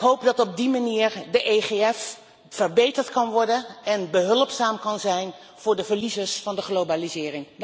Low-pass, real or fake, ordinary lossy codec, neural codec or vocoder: none; real; none; none